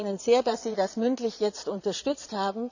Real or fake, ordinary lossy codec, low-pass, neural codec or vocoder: fake; none; 7.2 kHz; vocoder, 22.05 kHz, 80 mel bands, Vocos